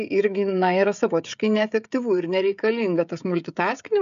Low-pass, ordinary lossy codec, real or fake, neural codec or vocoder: 7.2 kHz; MP3, 96 kbps; fake; codec, 16 kHz, 16 kbps, FreqCodec, smaller model